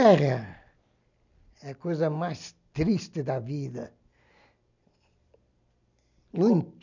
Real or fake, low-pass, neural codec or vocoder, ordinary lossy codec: real; 7.2 kHz; none; none